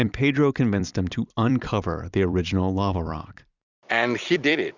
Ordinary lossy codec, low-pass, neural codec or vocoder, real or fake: Opus, 64 kbps; 7.2 kHz; vocoder, 44.1 kHz, 128 mel bands every 256 samples, BigVGAN v2; fake